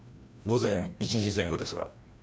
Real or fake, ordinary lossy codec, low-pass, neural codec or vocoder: fake; none; none; codec, 16 kHz, 1 kbps, FreqCodec, larger model